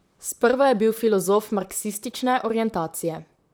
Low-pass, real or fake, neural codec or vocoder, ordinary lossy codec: none; fake; vocoder, 44.1 kHz, 128 mel bands, Pupu-Vocoder; none